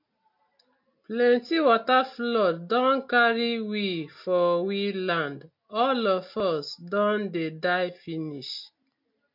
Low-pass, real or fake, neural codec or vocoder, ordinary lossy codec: 5.4 kHz; real; none; MP3, 48 kbps